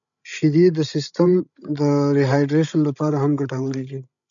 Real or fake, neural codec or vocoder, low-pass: fake; codec, 16 kHz, 16 kbps, FreqCodec, larger model; 7.2 kHz